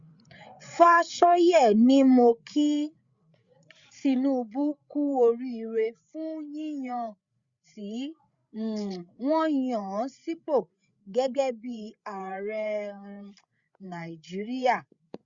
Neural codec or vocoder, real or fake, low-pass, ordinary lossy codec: codec, 16 kHz, 8 kbps, FreqCodec, larger model; fake; 7.2 kHz; Opus, 64 kbps